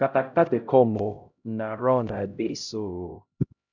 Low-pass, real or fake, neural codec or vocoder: 7.2 kHz; fake; codec, 16 kHz, 0.5 kbps, X-Codec, HuBERT features, trained on LibriSpeech